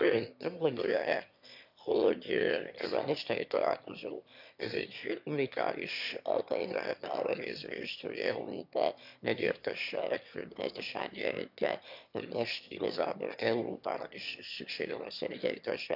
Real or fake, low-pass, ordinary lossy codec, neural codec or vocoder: fake; 5.4 kHz; none; autoencoder, 22.05 kHz, a latent of 192 numbers a frame, VITS, trained on one speaker